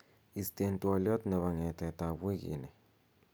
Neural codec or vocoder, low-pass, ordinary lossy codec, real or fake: none; none; none; real